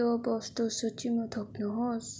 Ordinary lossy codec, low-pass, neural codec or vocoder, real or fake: none; none; none; real